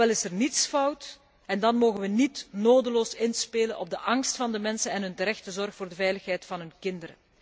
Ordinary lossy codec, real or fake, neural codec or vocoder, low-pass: none; real; none; none